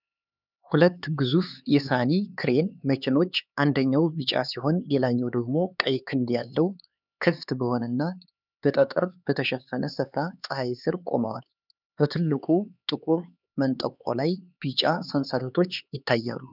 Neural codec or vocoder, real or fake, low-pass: codec, 16 kHz, 4 kbps, X-Codec, HuBERT features, trained on LibriSpeech; fake; 5.4 kHz